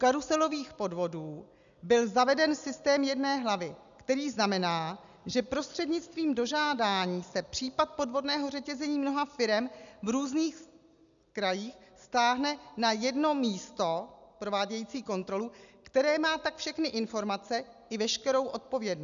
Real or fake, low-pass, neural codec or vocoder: real; 7.2 kHz; none